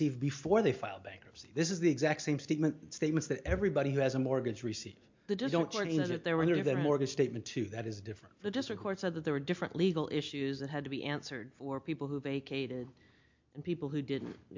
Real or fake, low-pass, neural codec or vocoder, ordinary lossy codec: real; 7.2 kHz; none; MP3, 48 kbps